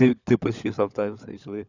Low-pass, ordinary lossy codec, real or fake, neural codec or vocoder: 7.2 kHz; none; fake; codec, 16 kHz, 16 kbps, FunCodec, trained on LibriTTS, 50 frames a second